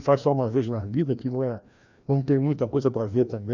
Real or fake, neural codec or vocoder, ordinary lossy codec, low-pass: fake; codec, 16 kHz, 1 kbps, FreqCodec, larger model; none; 7.2 kHz